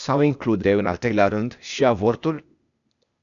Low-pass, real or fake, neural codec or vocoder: 7.2 kHz; fake; codec, 16 kHz, 0.8 kbps, ZipCodec